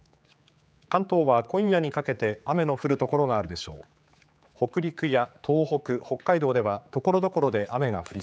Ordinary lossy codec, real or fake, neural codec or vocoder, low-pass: none; fake; codec, 16 kHz, 4 kbps, X-Codec, HuBERT features, trained on general audio; none